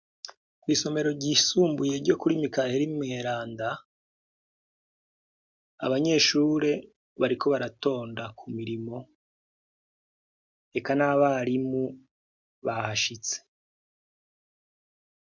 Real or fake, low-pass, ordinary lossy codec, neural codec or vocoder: real; 7.2 kHz; MP3, 64 kbps; none